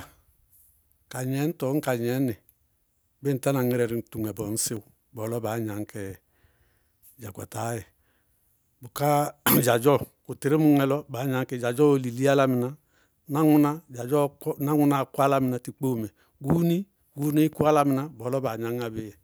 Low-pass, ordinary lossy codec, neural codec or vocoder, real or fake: none; none; none; real